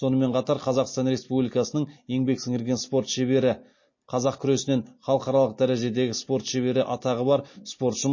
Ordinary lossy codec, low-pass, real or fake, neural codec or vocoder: MP3, 32 kbps; 7.2 kHz; real; none